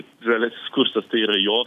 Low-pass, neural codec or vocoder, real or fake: 14.4 kHz; none; real